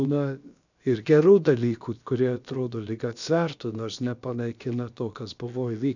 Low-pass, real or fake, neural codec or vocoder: 7.2 kHz; fake; codec, 16 kHz, about 1 kbps, DyCAST, with the encoder's durations